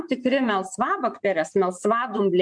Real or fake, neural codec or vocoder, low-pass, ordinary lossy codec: fake; vocoder, 24 kHz, 100 mel bands, Vocos; 9.9 kHz; MP3, 96 kbps